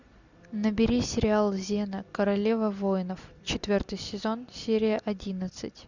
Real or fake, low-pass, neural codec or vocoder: real; 7.2 kHz; none